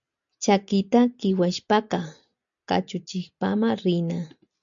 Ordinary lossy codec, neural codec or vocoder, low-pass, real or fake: MP3, 64 kbps; none; 7.2 kHz; real